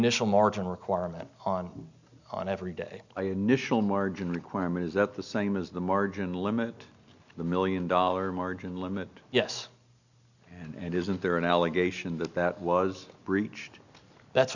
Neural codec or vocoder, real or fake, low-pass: none; real; 7.2 kHz